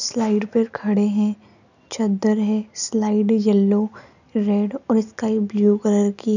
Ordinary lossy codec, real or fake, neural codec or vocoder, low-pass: none; real; none; 7.2 kHz